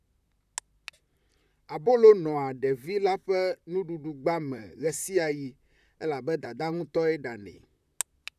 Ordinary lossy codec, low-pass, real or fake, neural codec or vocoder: none; 14.4 kHz; fake; vocoder, 44.1 kHz, 128 mel bands, Pupu-Vocoder